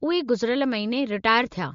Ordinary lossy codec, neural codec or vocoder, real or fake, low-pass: MP3, 48 kbps; none; real; 7.2 kHz